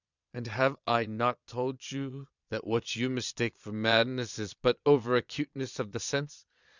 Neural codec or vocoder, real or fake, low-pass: vocoder, 22.05 kHz, 80 mel bands, Vocos; fake; 7.2 kHz